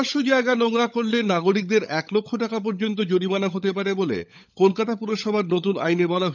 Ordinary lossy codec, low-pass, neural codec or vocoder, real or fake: none; 7.2 kHz; codec, 16 kHz, 16 kbps, FunCodec, trained on LibriTTS, 50 frames a second; fake